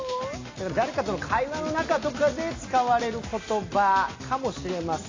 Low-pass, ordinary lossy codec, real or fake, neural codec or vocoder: 7.2 kHz; AAC, 32 kbps; real; none